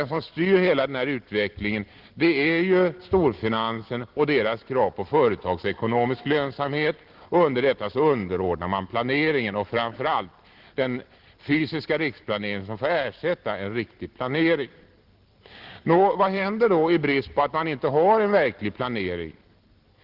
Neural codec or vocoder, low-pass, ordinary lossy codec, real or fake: none; 5.4 kHz; Opus, 16 kbps; real